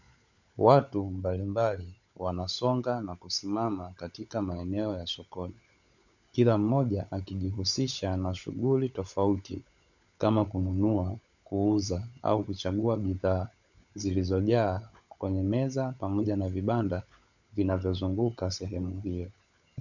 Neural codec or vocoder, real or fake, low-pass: codec, 16 kHz, 16 kbps, FunCodec, trained on LibriTTS, 50 frames a second; fake; 7.2 kHz